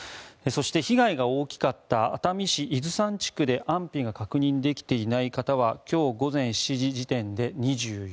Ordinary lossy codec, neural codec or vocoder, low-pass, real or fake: none; none; none; real